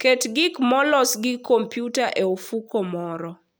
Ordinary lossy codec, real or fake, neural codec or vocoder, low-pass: none; real; none; none